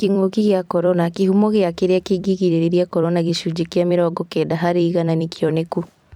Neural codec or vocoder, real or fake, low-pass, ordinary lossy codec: vocoder, 44.1 kHz, 128 mel bands every 256 samples, BigVGAN v2; fake; 19.8 kHz; none